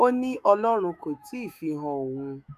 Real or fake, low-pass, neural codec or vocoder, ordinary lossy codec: fake; 14.4 kHz; autoencoder, 48 kHz, 128 numbers a frame, DAC-VAE, trained on Japanese speech; none